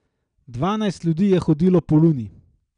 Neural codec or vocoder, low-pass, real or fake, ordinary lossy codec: none; 9.9 kHz; real; none